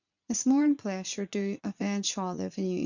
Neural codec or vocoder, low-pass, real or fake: vocoder, 44.1 kHz, 80 mel bands, Vocos; 7.2 kHz; fake